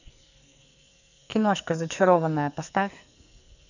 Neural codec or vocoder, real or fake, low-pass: codec, 44.1 kHz, 2.6 kbps, SNAC; fake; 7.2 kHz